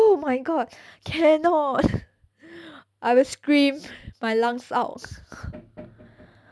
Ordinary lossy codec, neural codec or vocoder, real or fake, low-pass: none; none; real; none